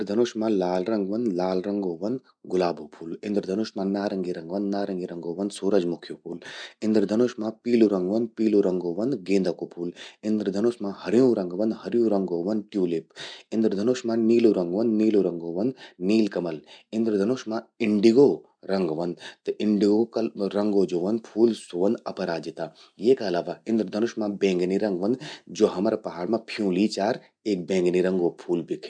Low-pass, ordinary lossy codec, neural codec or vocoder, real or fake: 9.9 kHz; none; none; real